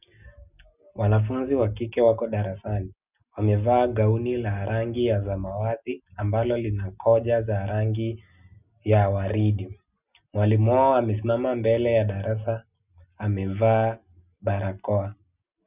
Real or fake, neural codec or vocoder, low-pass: real; none; 3.6 kHz